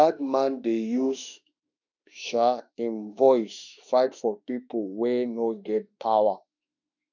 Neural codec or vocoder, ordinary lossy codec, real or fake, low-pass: autoencoder, 48 kHz, 32 numbers a frame, DAC-VAE, trained on Japanese speech; none; fake; 7.2 kHz